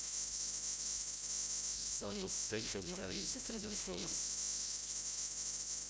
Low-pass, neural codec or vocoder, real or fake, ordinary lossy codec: none; codec, 16 kHz, 0.5 kbps, FreqCodec, larger model; fake; none